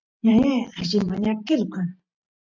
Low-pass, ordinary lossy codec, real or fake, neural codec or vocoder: 7.2 kHz; MP3, 64 kbps; fake; vocoder, 44.1 kHz, 128 mel bands every 512 samples, BigVGAN v2